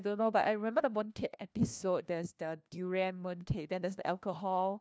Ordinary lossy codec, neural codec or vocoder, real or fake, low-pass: none; codec, 16 kHz, 1 kbps, FunCodec, trained on LibriTTS, 50 frames a second; fake; none